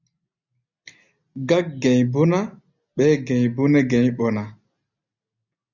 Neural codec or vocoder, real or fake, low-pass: none; real; 7.2 kHz